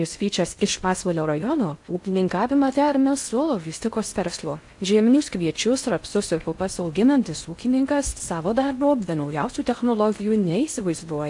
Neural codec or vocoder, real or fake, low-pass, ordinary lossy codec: codec, 16 kHz in and 24 kHz out, 0.6 kbps, FocalCodec, streaming, 2048 codes; fake; 10.8 kHz; AAC, 64 kbps